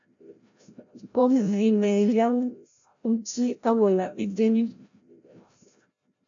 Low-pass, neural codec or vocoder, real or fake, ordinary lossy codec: 7.2 kHz; codec, 16 kHz, 0.5 kbps, FreqCodec, larger model; fake; MP3, 64 kbps